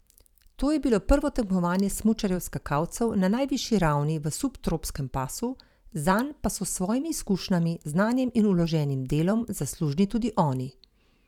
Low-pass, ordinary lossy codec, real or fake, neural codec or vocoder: 19.8 kHz; none; fake; vocoder, 44.1 kHz, 128 mel bands every 512 samples, BigVGAN v2